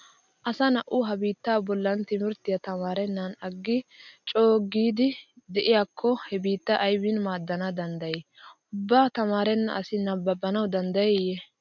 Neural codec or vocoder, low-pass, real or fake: none; 7.2 kHz; real